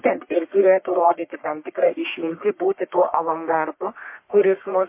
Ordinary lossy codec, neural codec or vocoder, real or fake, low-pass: MP3, 24 kbps; codec, 44.1 kHz, 1.7 kbps, Pupu-Codec; fake; 3.6 kHz